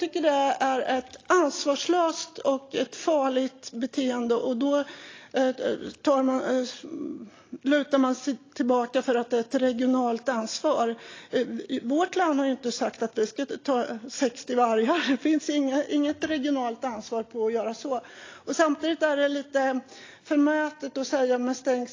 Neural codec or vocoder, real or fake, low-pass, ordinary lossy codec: none; real; 7.2 kHz; AAC, 32 kbps